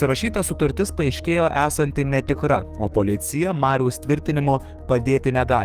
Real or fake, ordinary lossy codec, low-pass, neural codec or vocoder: fake; Opus, 32 kbps; 14.4 kHz; codec, 44.1 kHz, 2.6 kbps, SNAC